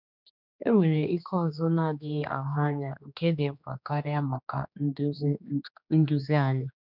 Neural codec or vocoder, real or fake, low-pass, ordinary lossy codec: codec, 16 kHz, 2 kbps, X-Codec, HuBERT features, trained on general audio; fake; 5.4 kHz; none